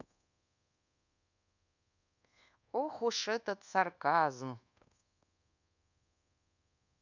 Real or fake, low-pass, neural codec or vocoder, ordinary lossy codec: fake; 7.2 kHz; codec, 24 kHz, 1.2 kbps, DualCodec; none